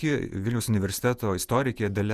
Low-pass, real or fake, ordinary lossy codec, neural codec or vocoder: 14.4 kHz; fake; Opus, 64 kbps; vocoder, 48 kHz, 128 mel bands, Vocos